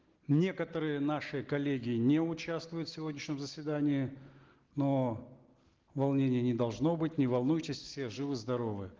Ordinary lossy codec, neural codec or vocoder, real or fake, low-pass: Opus, 16 kbps; none; real; 7.2 kHz